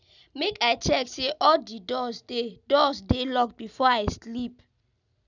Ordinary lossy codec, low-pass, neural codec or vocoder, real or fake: none; 7.2 kHz; none; real